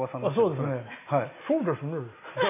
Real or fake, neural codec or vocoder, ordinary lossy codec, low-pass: real; none; none; 3.6 kHz